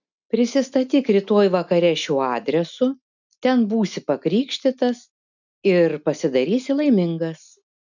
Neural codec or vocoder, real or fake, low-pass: none; real; 7.2 kHz